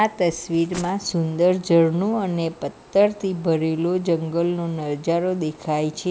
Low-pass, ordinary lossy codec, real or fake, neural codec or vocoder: none; none; real; none